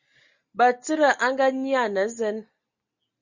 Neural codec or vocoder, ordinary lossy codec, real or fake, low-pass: none; Opus, 64 kbps; real; 7.2 kHz